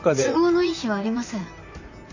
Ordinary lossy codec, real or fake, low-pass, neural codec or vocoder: none; fake; 7.2 kHz; vocoder, 44.1 kHz, 128 mel bands, Pupu-Vocoder